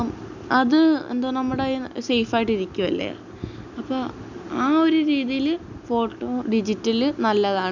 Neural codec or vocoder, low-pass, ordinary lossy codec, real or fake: none; 7.2 kHz; none; real